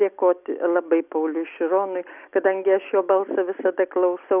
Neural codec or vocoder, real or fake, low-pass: none; real; 3.6 kHz